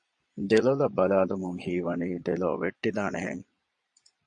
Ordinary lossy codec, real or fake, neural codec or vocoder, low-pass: MP3, 48 kbps; fake; vocoder, 22.05 kHz, 80 mel bands, Vocos; 9.9 kHz